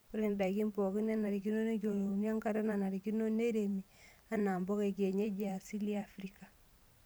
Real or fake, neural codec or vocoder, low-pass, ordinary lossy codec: fake; vocoder, 44.1 kHz, 128 mel bands every 512 samples, BigVGAN v2; none; none